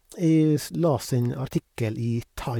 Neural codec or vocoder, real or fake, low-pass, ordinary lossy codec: vocoder, 44.1 kHz, 128 mel bands, Pupu-Vocoder; fake; 19.8 kHz; none